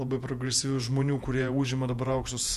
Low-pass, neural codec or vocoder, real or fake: 14.4 kHz; vocoder, 48 kHz, 128 mel bands, Vocos; fake